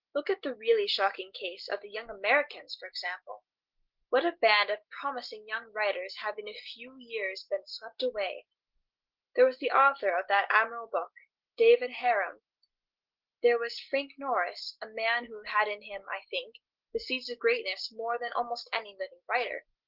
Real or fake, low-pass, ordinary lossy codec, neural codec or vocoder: real; 5.4 kHz; Opus, 16 kbps; none